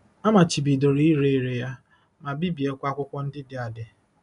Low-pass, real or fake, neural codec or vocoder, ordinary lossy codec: 10.8 kHz; real; none; none